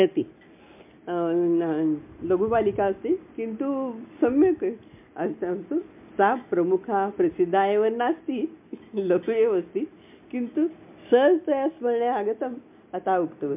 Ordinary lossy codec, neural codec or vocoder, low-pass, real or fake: MP3, 32 kbps; none; 3.6 kHz; real